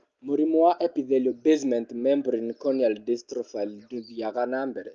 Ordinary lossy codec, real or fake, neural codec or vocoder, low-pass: Opus, 24 kbps; real; none; 7.2 kHz